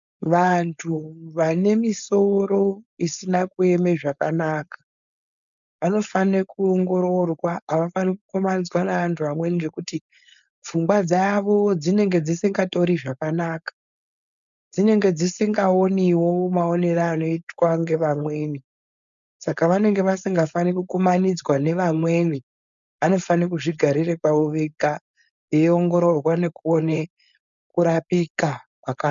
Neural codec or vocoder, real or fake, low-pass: codec, 16 kHz, 4.8 kbps, FACodec; fake; 7.2 kHz